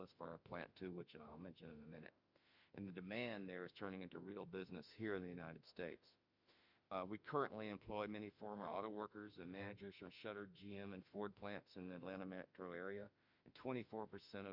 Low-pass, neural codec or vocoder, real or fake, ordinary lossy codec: 5.4 kHz; autoencoder, 48 kHz, 32 numbers a frame, DAC-VAE, trained on Japanese speech; fake; Opus, 64 kbps